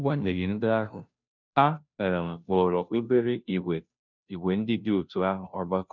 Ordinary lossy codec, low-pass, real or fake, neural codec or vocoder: none; 7.2 kHz; fake; codec, 16 kHz, 0.5 kbps, FunCodec, trained on Chinese and English, 25 frames a second